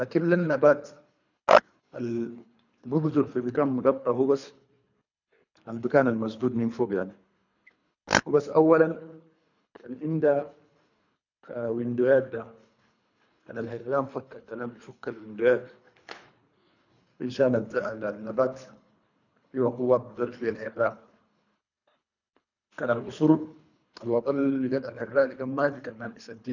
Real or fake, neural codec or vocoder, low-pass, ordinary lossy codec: fake; codec, 24 kHz, 3 kbps, HILCodec; 7.2 kHz; none